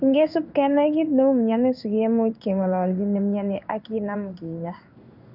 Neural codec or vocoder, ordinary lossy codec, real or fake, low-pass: codec, 16 kHz in and 24 kHz out, 1 kbps, XY-Tokenizer; none; fake; 5.4 kHz